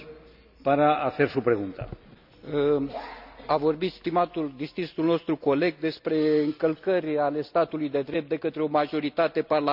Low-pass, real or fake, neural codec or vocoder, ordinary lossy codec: 5.4 kHz; real; none; none